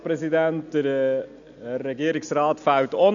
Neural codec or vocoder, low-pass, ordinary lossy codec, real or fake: none; 7.2 kHz; none; real